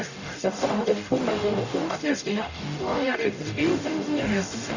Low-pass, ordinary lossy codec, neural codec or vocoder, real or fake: 7.2 kHz; none; codec, 44.1 kHz, 0.9 kbps, DAC; fake